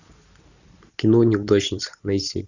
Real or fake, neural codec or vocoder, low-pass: real; none; 7.2 kHz